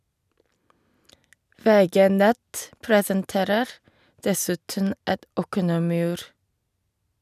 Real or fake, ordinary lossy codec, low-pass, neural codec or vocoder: real; none; 14.4 kHz; none